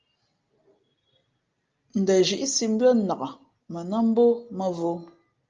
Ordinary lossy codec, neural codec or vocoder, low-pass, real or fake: Opus, 24 kbps; none; 7.2 kHz; real